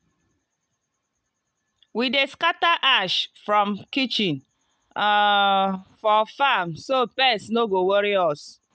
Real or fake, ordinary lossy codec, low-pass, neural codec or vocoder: real; none; none; none